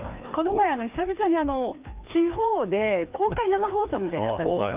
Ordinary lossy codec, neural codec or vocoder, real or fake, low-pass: Opus, 24 kbps; codec, 16 kHz, 2 kbps, FreqCodec, larger model; fake; 3.6 kHz